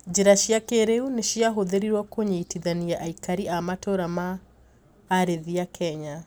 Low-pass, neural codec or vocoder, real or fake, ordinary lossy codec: none; none; real; none